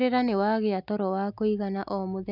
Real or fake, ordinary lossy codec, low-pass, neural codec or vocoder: real; none; 5.4 kHz; none